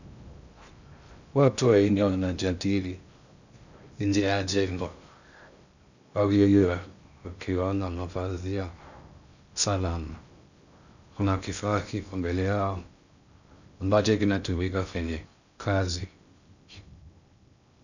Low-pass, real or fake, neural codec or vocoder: 7.2 kHz; fake; codec, 16 kHz in and 24 kHz out, 0.6 kbps, FocalCodec, streaming, 2048 codes